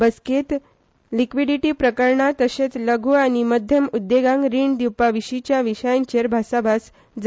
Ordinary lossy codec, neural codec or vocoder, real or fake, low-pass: none; none; real; none